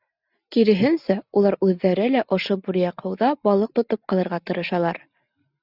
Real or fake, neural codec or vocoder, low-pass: real; none; 5.4 kHz